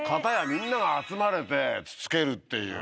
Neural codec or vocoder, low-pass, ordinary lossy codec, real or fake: none; none; none; real